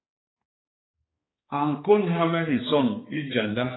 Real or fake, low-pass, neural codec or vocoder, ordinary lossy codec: fake; 7.2 kHz; codec, 16 kHz, 2 kbps, X-Codec, HuBERT features, trained on balanced general audio; AAC, 16 kbps